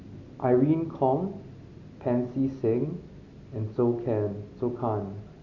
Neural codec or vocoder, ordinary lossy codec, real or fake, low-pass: none; none; real; 7.2 kHz